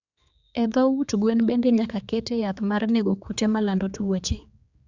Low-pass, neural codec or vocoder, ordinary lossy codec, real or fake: 7.2 kHz; codec, 16 kHz, 4 kbps, X-Codec, HuBERT features, trained on general audio; Opus, 64 kbps; fake